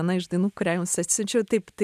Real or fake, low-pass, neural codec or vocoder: real; 14.4 kHz; none